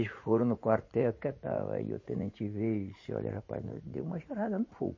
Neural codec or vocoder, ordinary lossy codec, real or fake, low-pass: vocoder, 44.1 kHz, 80 mel bands, Vocos; MP3, 32 kbps; fake; 7.2 kHz